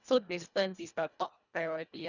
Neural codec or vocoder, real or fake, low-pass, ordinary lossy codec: codec, 24 kHz, 1.5 kbps, HILCodec; fake; 7.2 kHz; none